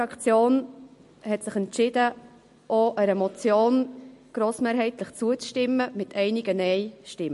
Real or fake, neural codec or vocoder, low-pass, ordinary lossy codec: real; none; 14.4 kHz; MP3, 48 kbps